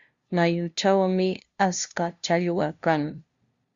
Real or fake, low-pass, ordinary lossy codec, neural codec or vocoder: fake; 7.2 kHz; Opus, 64 kbps; codec, 16 kHz, 0.5 kbps, FunCodec, trained on LibriTTS, 25 frames a second